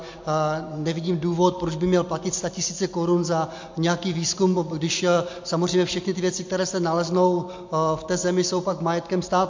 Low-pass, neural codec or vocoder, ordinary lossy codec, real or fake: 7.2 kHz; none; MP3, 48 kbps; real